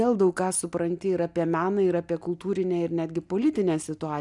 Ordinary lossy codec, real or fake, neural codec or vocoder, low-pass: MP3, 96 kbps; real; none; 10.8 kHz